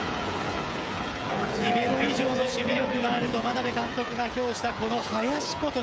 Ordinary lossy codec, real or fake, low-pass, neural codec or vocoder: none; fake; none; codec, 16 kHz, 16 kbps, FreqCodec, smaller model